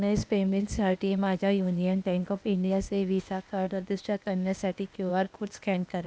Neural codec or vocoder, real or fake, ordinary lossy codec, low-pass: codec, 16 kHz, 0.8 kbps, ZipCodec; fake; none; none